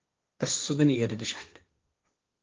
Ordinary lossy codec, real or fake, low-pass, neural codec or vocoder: Opus, 24 kbps; fake; 7.2 kHz; codec, 16 kHz, 1.1 kbps, Voila-Tokenizer